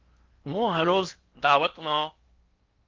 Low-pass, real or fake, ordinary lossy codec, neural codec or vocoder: 7.2 kHz; fake; Opus, 24 kbps; codec, 16 kHz in and 24 kHz out, 0.8 kbps, FocalCodec, streaming, 65536 codes